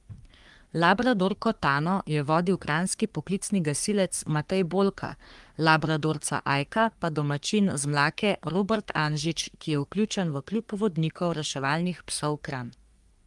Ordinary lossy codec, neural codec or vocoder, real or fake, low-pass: Opus, 32 kbps; codec, 44.1 kHz, 3.4 kbps, Pupu-Codec; fake; 10.8 kHz